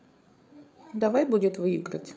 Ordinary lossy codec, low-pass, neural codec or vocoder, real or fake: none; none; codec, 16 kHz, 8 kbps, FreqCodec, larger model; fake